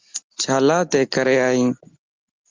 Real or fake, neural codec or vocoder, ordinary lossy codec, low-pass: real; none; Opus, 32 kbps; 7.2 kHz